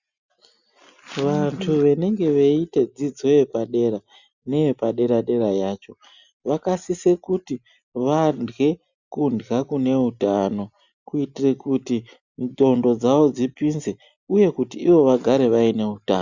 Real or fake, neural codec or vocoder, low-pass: real; none; 7.2 kHz